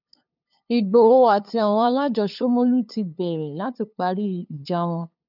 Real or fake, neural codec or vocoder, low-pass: fake; codec, 16 kHz, 2 kbps, FunCodec, trained on LibriTTS, 25 frames a second; 5.4 kHz